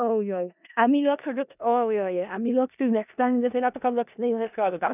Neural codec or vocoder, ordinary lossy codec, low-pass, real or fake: codec, 16 kHz in and 24 kHz out, 0.4 kbps, LongCat-Audio-Codec, four codebook decoder; none; 3.6 kHz; fake